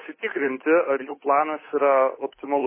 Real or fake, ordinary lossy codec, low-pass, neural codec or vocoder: fake; MP3, 16 kbps; 3.6 kHz; codec, 16 kHz, 8 kbps, FunCodec, trained on Chinese and English, 25 frames a second